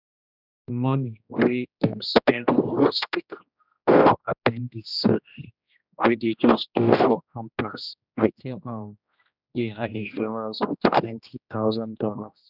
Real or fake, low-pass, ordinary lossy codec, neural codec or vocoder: fake; 5.4 kHz; none; codec, 16 kHz, 1 kbps, X-Codec, HuBERT features, trained on general audio